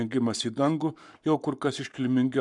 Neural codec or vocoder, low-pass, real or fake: codec, 44.1 kHz, 7.8 kbps, Pupu-Codec; 10.8 kHz; fake